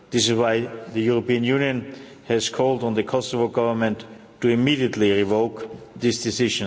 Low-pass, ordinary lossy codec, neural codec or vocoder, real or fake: none; none; none; real